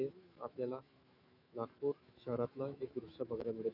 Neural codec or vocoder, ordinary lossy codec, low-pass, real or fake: autoencoder, 48 kHz, 128 numbers a frame, DAC-VAE, trained on Japanese speech; none; 5.4 kHz; fake